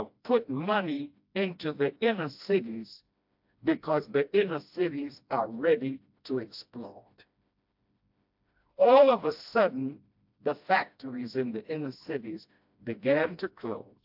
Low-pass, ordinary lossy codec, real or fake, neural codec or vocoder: 5.4 kHz; AAC, 48 kbps; fake; codec, 16 kHz, 1 kbps, FreqCodec, smaller model